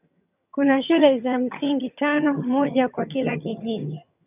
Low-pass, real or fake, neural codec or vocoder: 3.6 kHz; fake; vocoder, 22.05 kHz, 80 mel bands, HiFi-GAN